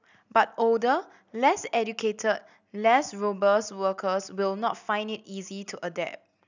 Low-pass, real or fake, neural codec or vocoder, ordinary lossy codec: 7.2 kHz; real; none; none